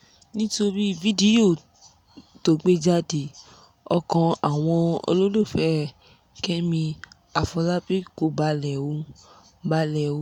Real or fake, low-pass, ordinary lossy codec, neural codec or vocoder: real; 19.8 kHz; none; none